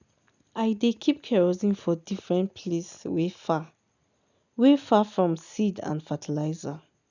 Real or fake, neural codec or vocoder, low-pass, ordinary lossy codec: real; none; 7.2 kHz; none